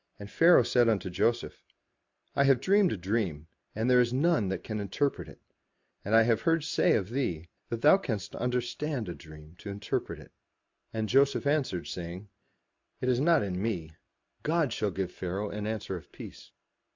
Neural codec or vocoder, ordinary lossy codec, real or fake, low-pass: none; MP3, 64 kbps; real; 7.2 kHz